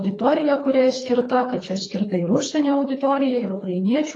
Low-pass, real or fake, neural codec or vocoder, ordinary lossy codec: 9.9 kHz; fake; codec, 24 kHz, 3 kbps, HILCodec; AAC, 32 kbps